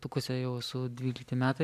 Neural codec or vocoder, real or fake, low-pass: none; real; 14.4 kHz